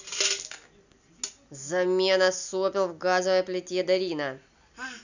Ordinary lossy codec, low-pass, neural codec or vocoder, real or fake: none; 7.2 kHz; none; real